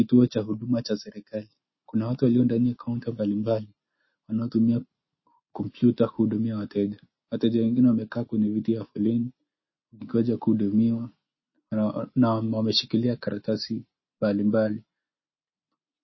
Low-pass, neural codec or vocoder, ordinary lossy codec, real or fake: 7.2 kHz; none; MP3, 24 kbps; real